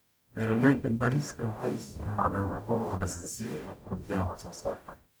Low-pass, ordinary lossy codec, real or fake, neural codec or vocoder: none; none; fake; codec, 44.1 kHz, 0.9 kbps, DAC